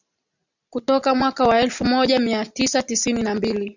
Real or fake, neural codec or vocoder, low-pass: real; none; 7.2 kHz